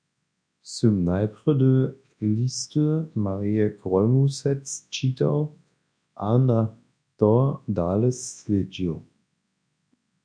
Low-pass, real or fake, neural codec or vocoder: 9.9 kHz; fake; codec, 24 kHz, 0.9 kbps, WavTokenizer, large speech release